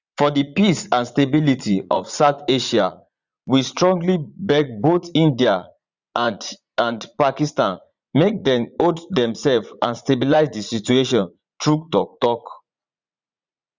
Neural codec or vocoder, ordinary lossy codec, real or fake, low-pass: vocoder, 44.1 kHz, 80 mel bands, Vocos; Opus, 64 kbps; fake; 7.2 kHz